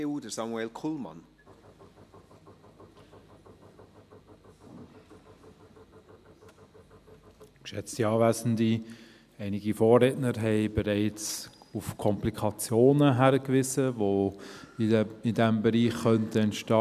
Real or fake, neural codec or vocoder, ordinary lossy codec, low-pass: real; none; none; 14.4 kHz